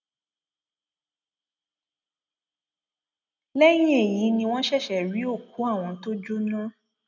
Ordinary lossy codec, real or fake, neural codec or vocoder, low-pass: none; real; none; 7.2 kHz